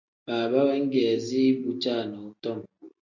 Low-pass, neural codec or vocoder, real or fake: 7.2 kHz; none; real